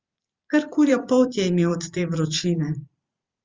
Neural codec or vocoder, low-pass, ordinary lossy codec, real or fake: vocoder, 44.1 kHz, 128 mel bands every 512 samples, BigVGAN v2; 7.2 kHz; Opus, 32 kbps; fake